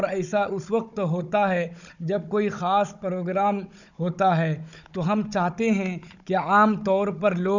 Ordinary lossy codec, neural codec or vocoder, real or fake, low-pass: none; codec, 16 kHz, 16 kbps, FunCodec, trained on Chinese and English, 50 frames a second; fake; 7.2 kHz